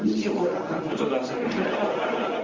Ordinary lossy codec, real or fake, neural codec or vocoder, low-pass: Opus, 32 kbps; fake; codec, 24 kHz, 0.9 kbps, WavTokenizer, medium speech release version 1; 7.2 kHz